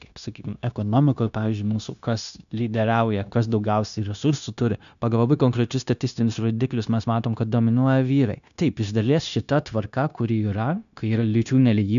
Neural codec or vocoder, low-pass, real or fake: codec, 16 kHz, 0.9 kbps, LongCat-Audio-Codec; 7.2 kHz; fake